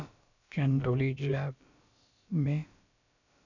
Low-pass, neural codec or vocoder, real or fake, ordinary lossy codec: 7.2 kHz; codec, 16 kHz, about 1 kbps, DyCAST, with the encoder's durations; fake; none